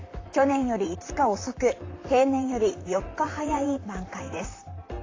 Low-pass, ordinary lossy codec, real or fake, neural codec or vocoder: 7.2 kHz; AAC, 32 kbps; fake; vocoder, 44.1 kHz, 128 mel bands, Pupu-Vocoder